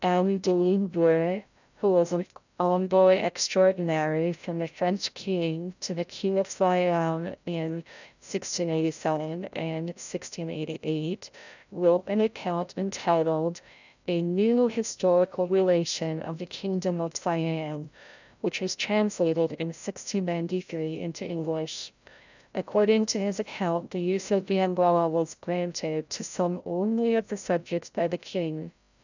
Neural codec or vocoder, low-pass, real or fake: codec, 16 kHz, 0.5 kbps, FreqCodec, larger model; 7.2 kHz; fake